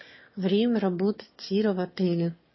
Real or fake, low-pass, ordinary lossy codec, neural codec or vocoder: fake; 7.2 kHz; MP3, 24 kbps; autoencoder, 22.05 kHz, a latent of 192 numbers a frame, VITS, trained on one speaker